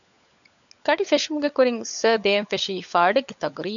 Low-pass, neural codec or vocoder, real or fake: 7.2 kHz; codec, 16 kHz, 16 kbps, FunCodec, trained on LibriTTS, 50 frames a second; fake